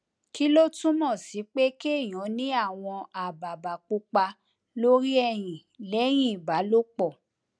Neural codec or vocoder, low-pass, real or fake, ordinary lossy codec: none; 9.9 kHz; real; none